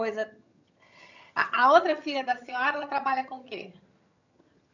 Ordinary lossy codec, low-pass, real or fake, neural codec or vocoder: none; 7.2 kHz; fake; vocoder, 22.05 kHz, 80 mel bands, HiFi-GAN